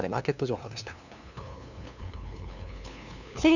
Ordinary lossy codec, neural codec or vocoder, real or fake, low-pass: none; codec, 16 kHz, 2 kbps, FunCodec, trained on LibriTTS, 25 frames a second; fake; 7.2 kHz